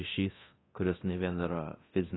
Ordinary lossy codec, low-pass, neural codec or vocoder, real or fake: AAC, 16 kbps; 7.2 kHz; codec, 24 kHz, 0.9 kbps, DualCodec; fake